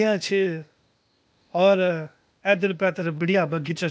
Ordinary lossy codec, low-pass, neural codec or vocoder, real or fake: none; none; codec, 16 kHz, 0.8 kbps, ZipCodec; fake